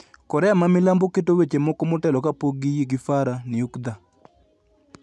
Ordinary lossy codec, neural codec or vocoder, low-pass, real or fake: none; none; none; real